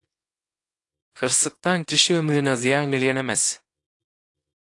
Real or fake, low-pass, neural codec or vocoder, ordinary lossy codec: fake; 10.8 kHz; codec, 24 kHz, 0.9 kbps, WavTokenizer, small release; AAC, 48 kbps